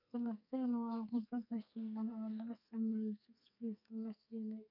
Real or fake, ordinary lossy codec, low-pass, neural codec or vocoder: fake; none; 5.4 kHz; codec, 44.1 kHz, 2.6 kbps, SNAC